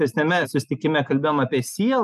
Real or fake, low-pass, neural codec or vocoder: real; 14.4 kHz; none